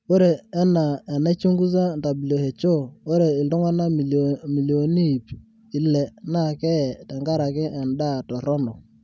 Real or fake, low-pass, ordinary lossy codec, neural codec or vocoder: real; none; none; none